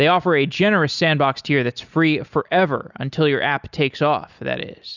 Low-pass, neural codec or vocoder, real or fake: 7.2 kHz; none; real